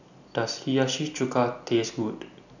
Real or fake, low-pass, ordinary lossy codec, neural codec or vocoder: real; 7.2 kHz; none; none